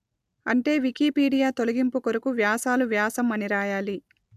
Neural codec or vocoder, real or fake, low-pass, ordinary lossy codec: none; real; 14.4 kHz; AAC, 96 kbps